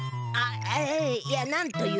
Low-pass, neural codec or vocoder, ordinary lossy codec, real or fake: none; none; none; real